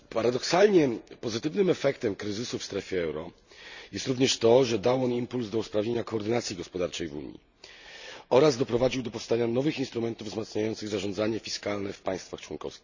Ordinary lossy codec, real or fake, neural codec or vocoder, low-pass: none; real; none; 7.2 kHz